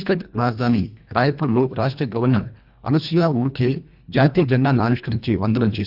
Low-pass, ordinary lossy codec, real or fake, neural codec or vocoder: 5.4 kHz; none; fake; codec, 24 kHz, 1.5 kbps, HILCodec